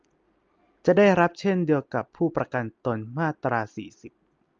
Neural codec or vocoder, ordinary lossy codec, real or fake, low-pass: none; Opus, 24 kbps; real; 7.2 kHz